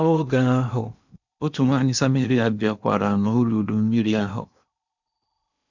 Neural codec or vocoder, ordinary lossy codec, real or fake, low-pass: codec, 16 kHz in and 24 kHz out, 0.8 kbps, FocalCodec, streaming, 65536 codes; none; fake; 7.2 kHz